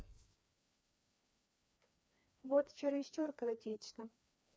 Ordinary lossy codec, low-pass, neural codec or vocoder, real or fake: none; none; codec, 16 kHz, 2 kbps, FreqCodec, larger model; fake